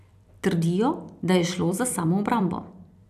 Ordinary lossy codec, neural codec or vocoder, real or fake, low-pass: none; vocoder, 44.1 kHz, 128 mel bands every 256 samples, BigVGAN v2; fake; 14.4 kHz